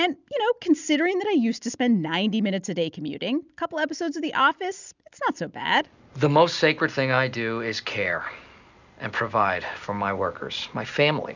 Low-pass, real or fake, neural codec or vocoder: 7.2 kHz; real; none